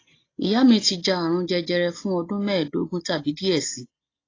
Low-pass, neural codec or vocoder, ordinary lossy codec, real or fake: 7.2 kHz; none; AAC, 32 kbps; real